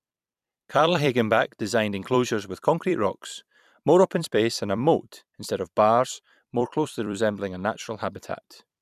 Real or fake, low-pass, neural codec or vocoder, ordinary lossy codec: fake; 14.4 kHz; vocoder, 44.1 kHz, 128 mel bands every 256 samples, BigVGAN v2; none